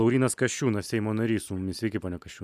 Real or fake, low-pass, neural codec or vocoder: real; 14.4 kHz; none